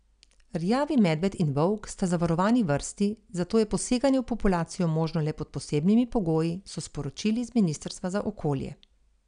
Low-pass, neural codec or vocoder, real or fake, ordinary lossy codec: 9.9 kHz; none; real; none